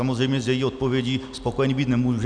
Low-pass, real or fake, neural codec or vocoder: 9.9 kHz; real; none